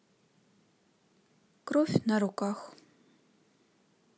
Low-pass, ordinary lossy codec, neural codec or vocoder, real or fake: none; none; none; real